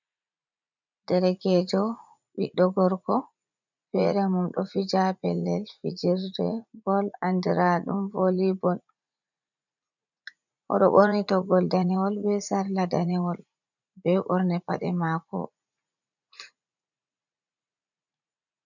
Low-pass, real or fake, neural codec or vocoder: 7.2 kHz; fake; vocoder, 44.1 kHz, 80 mel bands, Vocos